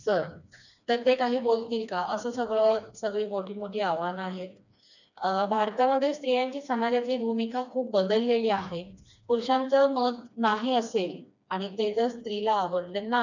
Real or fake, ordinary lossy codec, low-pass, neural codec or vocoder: fake; none; 7.2 kHz; codec, 16 kHz, 2 kbps, FreqCodec, smaller model